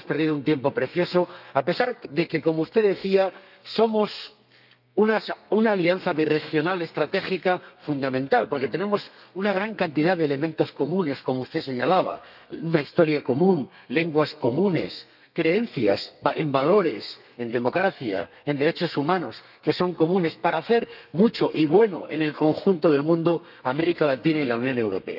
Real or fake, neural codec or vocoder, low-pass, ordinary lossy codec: fake; codec, 32 kHz, 1.9 kbps, SNAC; 5.4 kHz; none